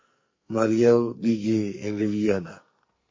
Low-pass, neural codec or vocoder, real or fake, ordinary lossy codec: 7.2 kHz; codec, 44.1 kHz, 2.6 kbps, SNAC; fake; MP3, 32 kbps